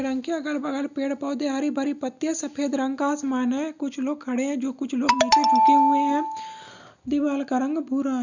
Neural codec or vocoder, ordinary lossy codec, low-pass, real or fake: none; none; 7.2 kHz; real